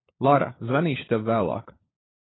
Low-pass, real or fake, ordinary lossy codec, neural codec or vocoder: 7.2 kHz; fake; AAC, 16 kbps; codec, 16 kHz, 16 kbps, FunCodec, trained on LibriTTS, 50 frames a second